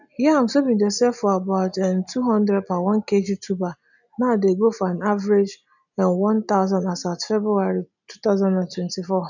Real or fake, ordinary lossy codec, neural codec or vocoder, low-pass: real; none; none; 7.2 kHz